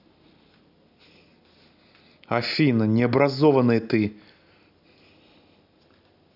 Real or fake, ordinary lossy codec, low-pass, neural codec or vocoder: real; none; 5.4 kHz; none